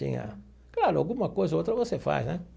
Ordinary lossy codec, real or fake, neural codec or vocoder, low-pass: none; real; none; none